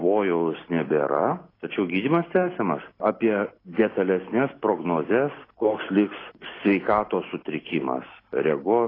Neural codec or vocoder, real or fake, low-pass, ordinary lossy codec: none; real; 5.4 kHz; AAC, 24 kbps